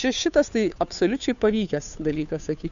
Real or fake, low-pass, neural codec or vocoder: fake; 7.2 kHz; codec, 16 kHz, 2 kbps, FunCodec, trained on Chinese and English, 25 frames a second